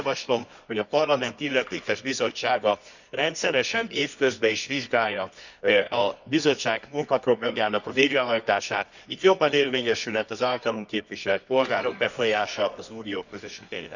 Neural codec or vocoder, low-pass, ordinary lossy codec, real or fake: codec, 24 kHz, 0.9 kbps, WavTokenizer, medium music audio release; 7.2 kHz; none; fake